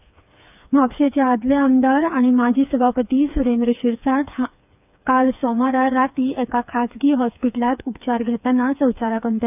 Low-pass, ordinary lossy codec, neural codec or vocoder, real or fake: 3.6 kHz; none; codec, 16 kHz, 4 kbps, FreqCodec, smaller model; fake